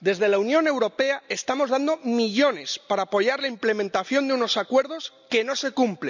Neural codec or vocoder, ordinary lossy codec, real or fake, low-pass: none; none; real; 7.2 kHz